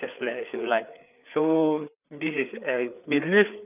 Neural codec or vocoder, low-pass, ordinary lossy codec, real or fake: codec, 16 kHz, 4 kbps, FreqCodec, larger model; 3.6 kHz; none; fake